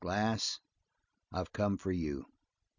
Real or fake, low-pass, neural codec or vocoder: real; 7.2 kHz; none